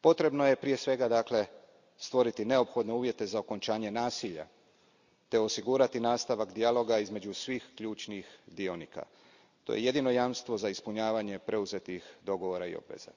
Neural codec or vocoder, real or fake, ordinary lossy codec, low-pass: none; real; none; 7.2 kHz